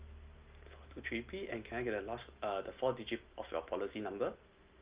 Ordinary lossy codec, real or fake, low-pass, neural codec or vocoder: Opus, 24 kbps; real; 3.6 kHz; none